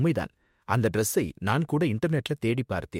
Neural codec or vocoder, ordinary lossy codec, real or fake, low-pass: autoencoder, 48 kHz, 32 numbers a frame, DAC-VAE, trained on Japanese speech; MP3, 64 kbps; fake; 19.8 kHz